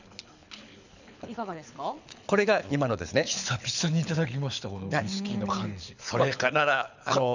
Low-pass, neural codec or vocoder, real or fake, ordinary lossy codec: 7.2 kHz; codec, 24 kHz, 6 kbps, HILCodec; fake; none